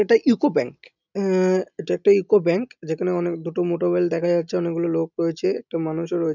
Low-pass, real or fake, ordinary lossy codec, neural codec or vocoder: 7.2 kHz; real; none; none